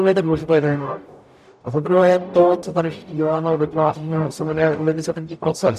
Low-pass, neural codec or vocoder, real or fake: 14.4 kHz; codec, 44.1 kHz, 0.9 kbps, DAC; fake